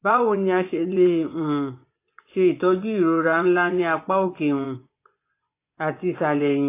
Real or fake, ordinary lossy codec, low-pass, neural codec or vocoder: fake; AAC, 24 kbps; 3.6 kHz; vocoder, 24 kHz, 100 mel bands, Vocos